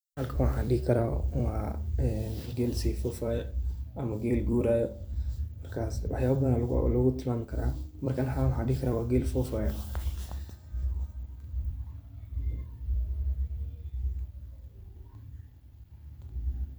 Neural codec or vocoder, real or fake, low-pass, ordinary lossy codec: vocoder, 44.1 kHz, 128 mel bands every 256 samples, BigVGAN v2; fake; none; none